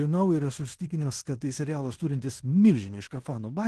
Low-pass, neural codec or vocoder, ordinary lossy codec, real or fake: 10.8 kHz; codec, 16 kHz in and 24 kHz out, 0.9 kbps, LongCat-Audio-Codec, fine tuned four codebook decoder; Opus, 16 kbps; fake